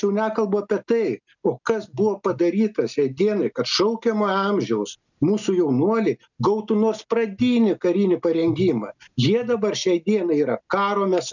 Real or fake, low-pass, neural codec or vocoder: real; 7.2 kHz; none